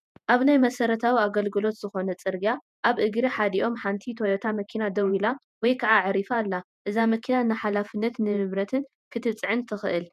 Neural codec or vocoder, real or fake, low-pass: vocoder, 48 kHz, 128 mel bands, Vocos; fake; 14.4 kHz